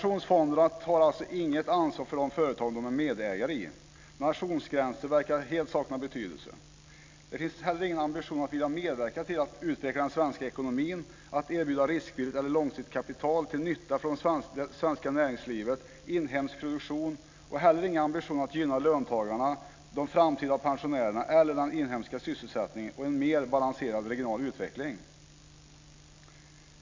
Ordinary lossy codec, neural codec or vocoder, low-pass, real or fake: MP3, 48 kbps; none; 7.2 kHz; real